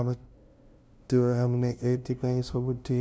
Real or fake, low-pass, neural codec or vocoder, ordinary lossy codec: fake; none; codec, 16 kHz, 0.5 kbps, FunCodec, trained on LibriTTS, 25 frames a second; none